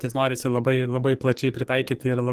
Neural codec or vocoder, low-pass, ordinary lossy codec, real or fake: codec, 44.1 kHz, 2.6 kbps, SNAC; 14.4 kHz; Opus, 24 kbps; fake